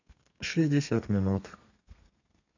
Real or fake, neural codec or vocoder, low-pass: fake; codec, 32 kHz, 1.9 kbps, SNAC; 7.2 kHz